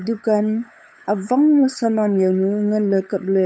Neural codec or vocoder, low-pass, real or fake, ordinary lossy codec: codec, 16 kHz, 8 kbps, FunCodec, trained on LibriTTS, 25 frames a second; none; fake; none